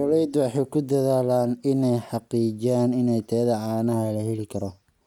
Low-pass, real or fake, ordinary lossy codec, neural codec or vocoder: 19.8 kHz; real; none; none